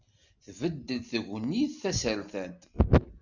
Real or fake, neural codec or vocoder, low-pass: real; none; 7.2 kHz